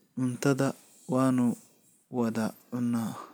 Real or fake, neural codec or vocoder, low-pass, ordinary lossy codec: real; none; none; none